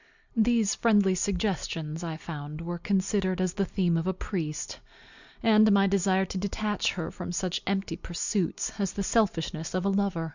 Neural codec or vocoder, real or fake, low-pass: none; real; 7.2 kHz